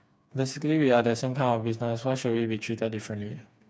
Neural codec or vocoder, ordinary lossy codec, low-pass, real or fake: codec, 16 kHz, 4 kbps, FreqCodec, smaller model; none; none; fake